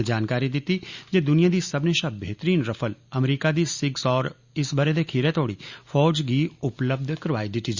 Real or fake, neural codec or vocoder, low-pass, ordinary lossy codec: real; none; 7.2 kHz; Opus, 64 kbps